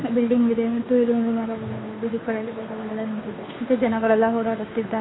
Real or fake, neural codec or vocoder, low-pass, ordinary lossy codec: fake; codec, 16 kHz, 2 kbps, FunCodec, trained on Chinese and English, 25 frames a second; 7.2 kHz; AAC, 16 kbps